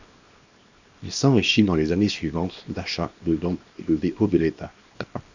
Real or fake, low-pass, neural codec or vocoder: fake; 7.2 kHz; codec, 24 kHz, 0.9 kbps, WavTokenizer, small release